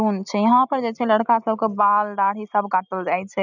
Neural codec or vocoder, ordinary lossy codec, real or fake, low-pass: none; none; real; 7.2 kHz